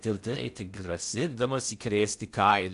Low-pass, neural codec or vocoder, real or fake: 10.8 kHz; codec, 16 kHz in and 24 kHz out, 0.6 kbps, FocalCodec, streaming, 4096 codes; fake